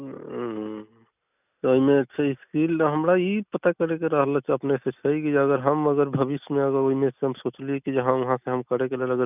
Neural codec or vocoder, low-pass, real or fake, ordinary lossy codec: none; 3.6 kHz; real; none